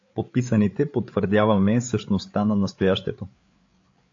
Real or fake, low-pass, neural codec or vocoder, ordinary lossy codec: fake; 7.2 kHz; codec, 16 kHz, 8 kbps, FreqCodec, larger model; AAC, 48 kbps